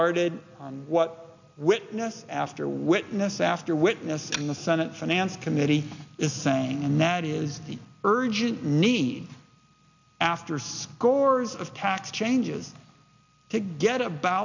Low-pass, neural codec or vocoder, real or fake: 7.2 kHz; none; real